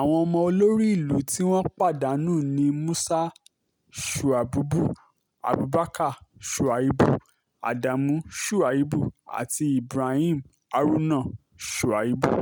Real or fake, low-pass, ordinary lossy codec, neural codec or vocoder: real; none; none; none